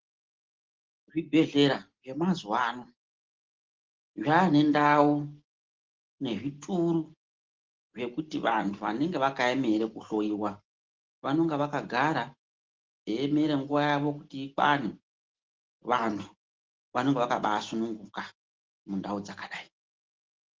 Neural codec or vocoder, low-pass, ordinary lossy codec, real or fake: none; 7.2 kHz; Opus, 16 kbps; real